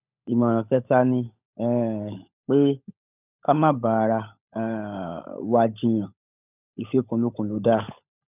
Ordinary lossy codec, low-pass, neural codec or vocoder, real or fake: none; 3.6 kHz; codec, 16 kHz, 16 kbps, FunCodec, trained on LibriTTS, 50 frames a second; fake